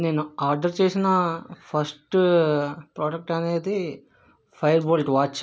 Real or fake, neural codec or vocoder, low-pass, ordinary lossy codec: real; none; none; none